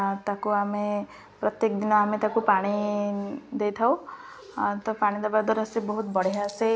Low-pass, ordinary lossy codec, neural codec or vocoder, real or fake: none; none; none; real